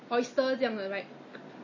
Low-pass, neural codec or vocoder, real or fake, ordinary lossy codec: 7.2 kHz; none; real; MP3, 32 kbps